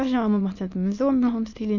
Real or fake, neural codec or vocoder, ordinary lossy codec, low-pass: fake; autoencoder, 22.05 kHz, a latent of 192 numbers a frame, VITS, trained on many speakers; none; 7.2 kHz